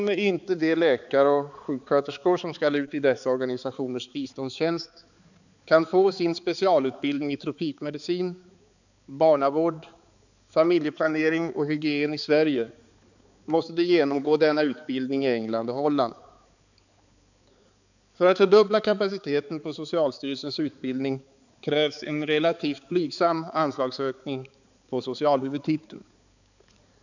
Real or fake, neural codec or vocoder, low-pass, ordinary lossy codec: fake; codec, 16 kHz, 4 kbps, X-Codec, HuBERT features, trained on balanced general audio; 7.2 kHz; none